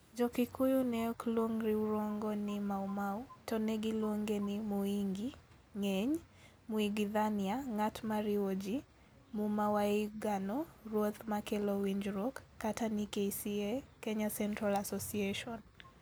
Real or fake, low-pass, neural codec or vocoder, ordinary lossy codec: real; none; none; none